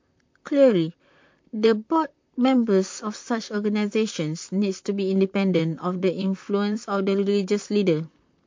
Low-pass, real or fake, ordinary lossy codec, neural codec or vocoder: 7.2 kHz; fake; MP3, 48 kbps; vocoder, 44.1 kHz, 128 mel bands, Pupu-Vocoder